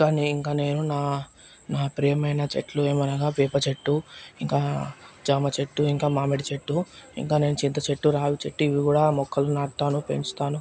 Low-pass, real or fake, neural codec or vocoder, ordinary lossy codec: none; real; none; none